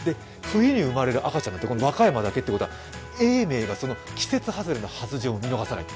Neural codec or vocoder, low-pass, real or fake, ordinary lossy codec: none; none; real; none